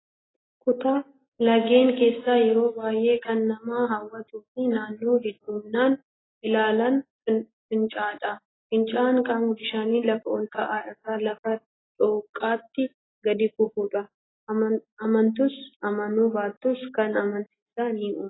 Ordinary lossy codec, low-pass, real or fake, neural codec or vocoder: AAC, 16 kbps; 7.2 kHz; real; none